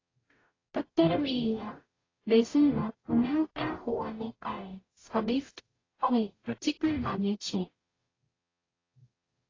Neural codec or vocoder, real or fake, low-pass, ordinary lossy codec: codec, 44.1 kHz, 0.9 kbps, DAC; fake; 7.2 kHz; AAC, 32 kbps